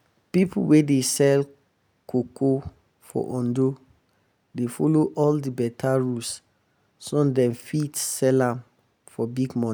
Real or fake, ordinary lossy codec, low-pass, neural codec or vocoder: real; none; none; none